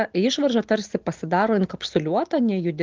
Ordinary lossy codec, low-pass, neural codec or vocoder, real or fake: Opus, 16 kbps; 7.2 kHz; none; real